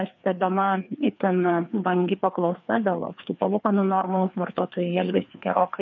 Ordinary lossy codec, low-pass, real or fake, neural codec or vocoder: MP3, 64 kbps; 7.2 kHz; fake; codec, 16 kHz, 2 kbps, FreqCodec, larger model